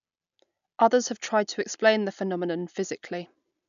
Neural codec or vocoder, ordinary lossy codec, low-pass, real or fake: none; none; 7.2 kHz; real